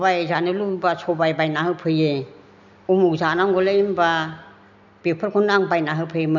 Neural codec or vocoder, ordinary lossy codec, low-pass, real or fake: none; none; 7.2 kHz; real